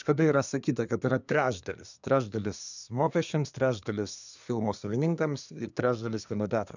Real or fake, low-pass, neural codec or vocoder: fake; 7.2 kHz; codec, 24 kHz, 1 kbps, SNAC